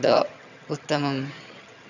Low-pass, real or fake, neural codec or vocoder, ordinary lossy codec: 7.2 kHz; fake; vocoder, 22.05 kHz, 80 mel bands, HiFi-GAN; none